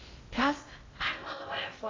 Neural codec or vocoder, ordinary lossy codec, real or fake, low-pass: codec, 16 kHz in and 24 kHz out, 0.8 kbps, FocalCodec, streaming, 65536 codes; AAC, 32 kbps; fake; 7.2 kHz